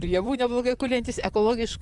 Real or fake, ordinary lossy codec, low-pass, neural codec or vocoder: fake; Opus, 64 kbps; 10.8 kHz; vocoder, 44.1 kHz, 128 mel bands, Pupu-Vocoder